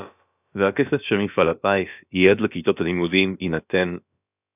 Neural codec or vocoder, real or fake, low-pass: codec, 16 kHz, about 1 kbps, DyCAST, with the encoder's durations; fake; 3.6 kHz